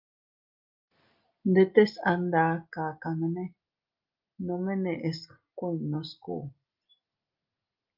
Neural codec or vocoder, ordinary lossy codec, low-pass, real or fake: none; Opus, 24 kbps; 5.4 kHz; real